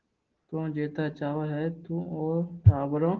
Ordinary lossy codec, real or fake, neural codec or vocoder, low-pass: Opus, 16 kbps; real; none; 7.2 kHz